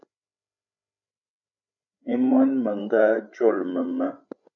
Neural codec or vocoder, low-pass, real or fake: codec, 16 kHz, 8 kbps, FreqCodec, larger model; 7.2 kHz; fake